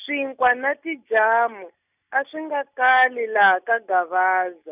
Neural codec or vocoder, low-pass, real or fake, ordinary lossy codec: none; 3.6 kHz; real; none